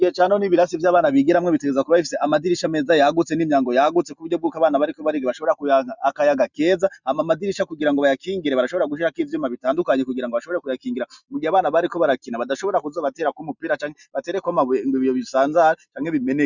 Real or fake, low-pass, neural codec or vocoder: real; 7.2 kHz; none